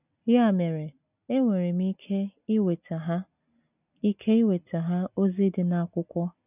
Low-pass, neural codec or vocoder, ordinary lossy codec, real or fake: 3.6 kHz; none; none; real